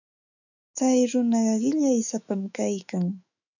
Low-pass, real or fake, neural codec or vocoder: 7.2 kHz; fake; autoencoder, 48 kHz, 128 numbers a frame, DAC-VAE, trained on Japanese speech